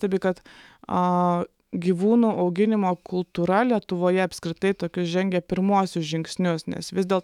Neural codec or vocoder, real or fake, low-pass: autoencoder, 48 kHz, 128 numbers a frame, DAC-VAE, trained on Japanese speech; fake; 19.8 kHz